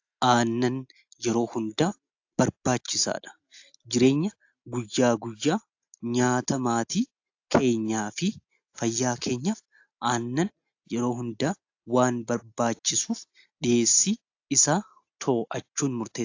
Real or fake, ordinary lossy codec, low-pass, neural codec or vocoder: real; AAC, 48 kbps; 7.2 kHz; none